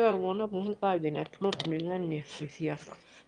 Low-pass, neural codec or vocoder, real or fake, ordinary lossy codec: 9.9 kHz; autoencoder, 22.05 kHz, a latent of 192 numbers a frame, VITS, trained on one speaker; fake; Opus, 32 kbps